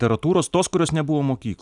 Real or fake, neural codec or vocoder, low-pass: real; none; 10.8 kHz